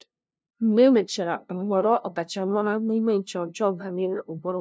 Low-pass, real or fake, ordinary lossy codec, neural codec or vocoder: none; fake; none; codec, 16 kHz, 0.5 kbps, FunCodec, trained on LibriTTS, 25 frames a second